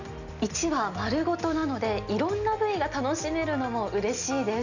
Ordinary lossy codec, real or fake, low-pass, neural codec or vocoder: none; real; 7.2 kHz; none